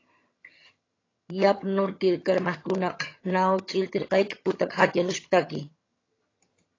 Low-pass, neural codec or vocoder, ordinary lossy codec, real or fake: 7.2 kHz; vocoder, 22.05 kHz, 80 mel bands, HiFi-GAN; AAC, 32 kbps; fake